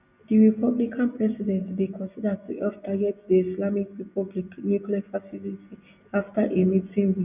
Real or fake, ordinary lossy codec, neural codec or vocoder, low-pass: real; none; none; 3.6 kHz